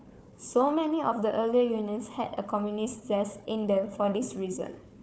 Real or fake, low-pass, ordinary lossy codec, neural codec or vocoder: fake; none; none; codec, 16 kHz, 4 kbps, FunCodec, trained on Chinese and English, 50 frames a second